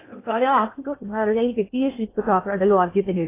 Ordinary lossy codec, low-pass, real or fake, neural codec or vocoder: AAC, 24 kbps; 3.6 kHz; fake; codec, 16 kHz in and 24 kHz out, 0.6 kbps, FocalCodec, streaming, 2048 codes